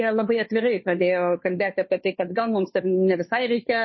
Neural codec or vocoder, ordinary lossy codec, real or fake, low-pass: codec, 16 kHz, 2 kbps, FunCodec, trained on Chinese and English, 25 frames a second; MP3, 24 kbps; fake; 7.2 kHz